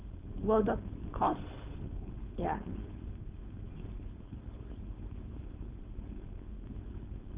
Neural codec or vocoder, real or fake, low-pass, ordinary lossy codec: codec, 16 kHz, 4.8 kbps, FACodec; fake; 3.6 kHz; Opus, 32 kbps